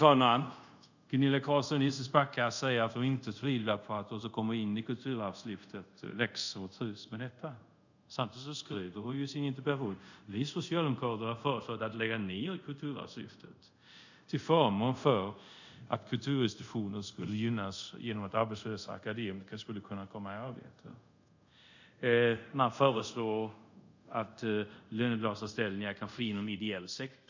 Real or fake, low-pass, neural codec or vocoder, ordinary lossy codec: fake; 7.2 kHz; codec, 24 kHz, 0.5 kbps, DualCodec; none